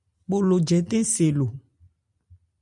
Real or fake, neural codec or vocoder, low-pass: real; none; 10.8 kHz